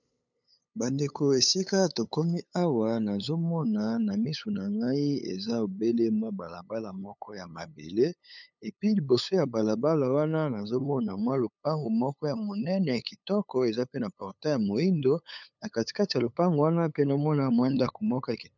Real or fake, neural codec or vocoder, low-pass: fake; codec, 16 kHz, 8 kbps, FunCodec, trained on LibriTTS, 25 frames a second; 7.2 kHz